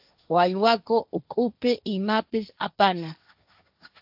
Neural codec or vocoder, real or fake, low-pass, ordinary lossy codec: codec, 16 kHz, 1.1 kbps, Voila-Tokenizer; fake; 5.4 kHz; AAC, 48 kbps